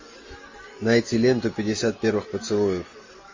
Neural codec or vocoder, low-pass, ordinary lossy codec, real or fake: none; 7.2 kHz; MP3, 32 kbps; real